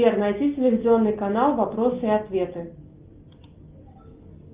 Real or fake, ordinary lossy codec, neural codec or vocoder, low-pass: real; Opus, 24 kbps; none; 3.6 kHz